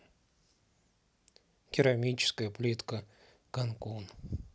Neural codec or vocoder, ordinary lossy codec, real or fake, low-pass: none; none; real; none